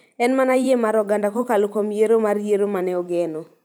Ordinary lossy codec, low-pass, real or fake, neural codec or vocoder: none; none; fake; vocoder, 44.1 kHz, 128 mel bands every 512 samples, BigVGAN v2